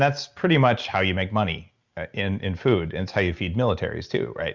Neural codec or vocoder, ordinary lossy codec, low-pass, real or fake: none; Opus, 64 kbps; 7.2 kHz; real